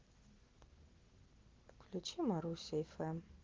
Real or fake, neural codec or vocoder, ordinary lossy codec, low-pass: real; none; Opus, 16 kbps; 7.2 kHz